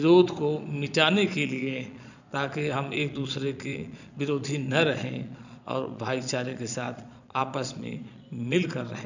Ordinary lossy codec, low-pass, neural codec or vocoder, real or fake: none; 7.2 kHz; vocoder, 22.05 kHz, 80 mel bands, Vocos; fake